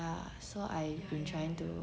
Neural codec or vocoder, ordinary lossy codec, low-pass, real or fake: none; none; none; real